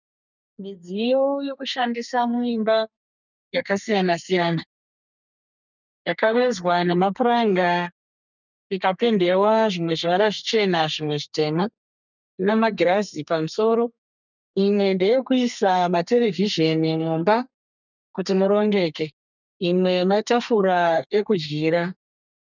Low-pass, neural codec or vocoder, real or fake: 7.2 kHz; codec, 32 kHz, 1.9 kbps, SNAC; fake